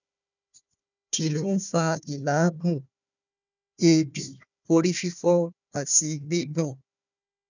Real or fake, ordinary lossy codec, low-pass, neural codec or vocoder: fake; none; 7.2 kHz; codec, 16 kHz, 1 kbps, FunCodec, trained on Chinese and English, 50 frames a second